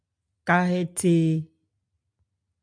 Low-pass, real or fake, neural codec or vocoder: 9.9 kHz; real; none